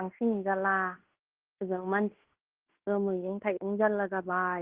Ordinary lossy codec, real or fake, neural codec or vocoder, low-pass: Opus, 16 kbps; fake; codec, 16 kHz in and 24 kHz out, 0.9 kbps, LongCat-Audio-Codec, fine tuned four codebook decoder; 3.6 kHz